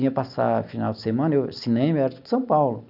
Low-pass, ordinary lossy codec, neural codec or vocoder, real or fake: 5.4 kHz; none; none; real